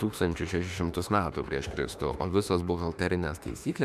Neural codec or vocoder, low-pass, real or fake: autoencoder, 48 kHz, 32 numbers a frame, DAC-VAE, trained on Japanese speech; 14.4 kHz; fake